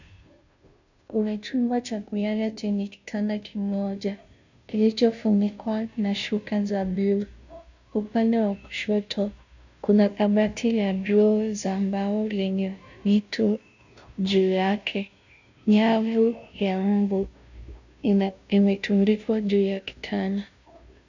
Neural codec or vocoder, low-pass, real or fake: codec, 16 kHz, 0.5 kbps, FunCodec, trained on Chinese and English, 25 frames a second; 7.2 kHz; fake